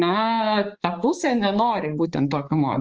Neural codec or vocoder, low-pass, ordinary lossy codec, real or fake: codec, 16 kHz, 4 kbps, X-Codec, HuBERT features, trained on balanced general audio; 7.2 kHz; Opus, 24 kbps; fake